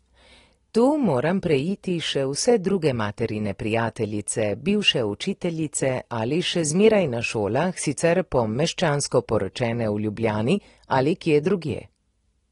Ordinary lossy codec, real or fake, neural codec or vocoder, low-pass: AAC, 32 kbps; real; none; 10.8 kHz